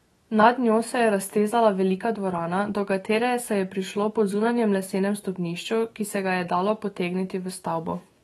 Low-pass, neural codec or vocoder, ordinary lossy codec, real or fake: 19.8 kHz; autoencoder, 48 kHz, 128 numbers a frame, DAC-VAE, trained on Japanese speech; AAC, 32 kbps; fake